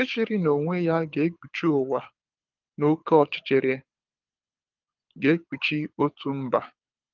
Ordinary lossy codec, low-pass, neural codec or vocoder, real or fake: Opus, 32 kbps; 7.2 kHz; codec, 24 kHz, 6 kbps, HILCodec; fake